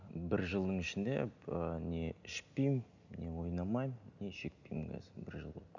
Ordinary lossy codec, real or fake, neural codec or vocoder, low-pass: none; real; none; 7.2 kHz